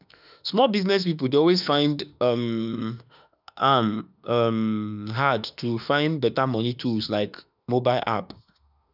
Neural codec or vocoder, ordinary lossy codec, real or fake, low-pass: autoencoder, 48 kHz, 32 numbers a frame, DAC-VAE, trained on Japanese speech; none; fake; 5.4 kHz